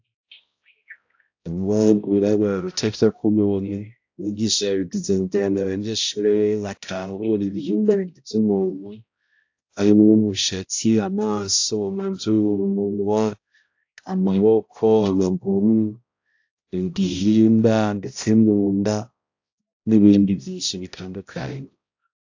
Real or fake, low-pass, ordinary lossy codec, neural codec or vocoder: fake; 7.2 kHz; AAC, 48 kbps; codec, 16 kHz, 0.5 kbps, X-Codec, HuBERT features, trained on balanced general audio